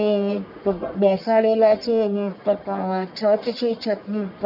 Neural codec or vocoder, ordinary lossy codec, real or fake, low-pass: codec, 44.1 kHz, 1.7 kbps, Pupu-Codec; none; fake; 5.4 kHz